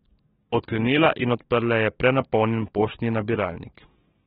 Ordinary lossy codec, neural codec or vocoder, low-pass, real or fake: AAC, 16 kbps; codec, 44.1 kHz, 7.8 kbps, DAC; 19.8 kHz; fake